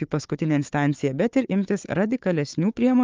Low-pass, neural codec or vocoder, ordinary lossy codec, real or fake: 7.2 kHz; codec, 16 kHz, 4 kbps, FunCodec, trained on Chinese and English, 50 frames a second; Opus, 32 kbps; fake